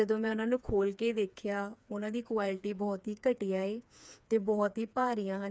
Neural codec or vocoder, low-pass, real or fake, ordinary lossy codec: codec, 16 kHz, 4 kbps, FreqCodec, smaller model; none; fake; none